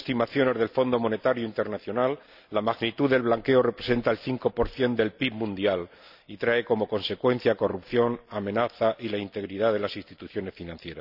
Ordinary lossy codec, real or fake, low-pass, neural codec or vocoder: none; real; 5.4 kHz; none